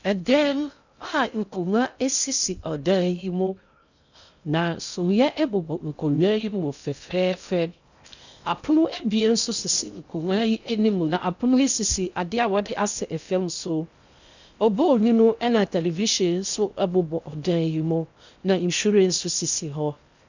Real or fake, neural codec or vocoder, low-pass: fake; codec, 16 kHz in and 24 kHz out, 0.6 kbps, FocalCodec, streaming, 2048 codes; 7.2 kHz